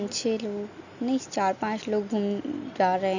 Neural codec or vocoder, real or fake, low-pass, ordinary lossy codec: none; real; 7.2 kHz; none